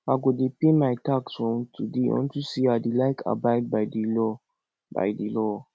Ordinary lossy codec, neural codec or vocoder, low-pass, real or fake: none; none; none; real